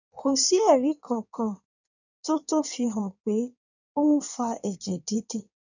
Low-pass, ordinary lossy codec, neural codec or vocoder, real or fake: 7.2 kHz; none; codec, 16 kHz in and 24 kHz out, 1.1 kbps, FireRedTTS-2 codec; fake